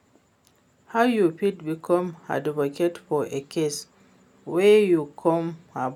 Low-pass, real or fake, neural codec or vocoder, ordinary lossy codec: 19.8 kHz; real; none; none